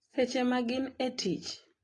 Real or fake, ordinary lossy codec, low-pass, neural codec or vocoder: real; AAC, 32 kbps; 10.8 kHz; none